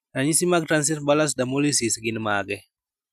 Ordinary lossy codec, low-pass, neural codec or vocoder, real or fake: none; 14.4 kHz; none; real